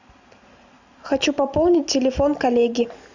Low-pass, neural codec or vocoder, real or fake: 7.2 kHz; none; real